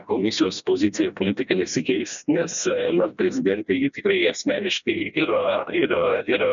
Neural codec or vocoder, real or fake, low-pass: codec, 16 kHz, 1 kbps, FreqCodec, smaller model; fake; 7.2 kHz